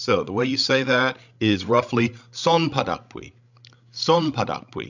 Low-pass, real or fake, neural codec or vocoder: 7.2 kHz; fake; codec, 16 kHz, 16 kbps, FreqCodec, larger model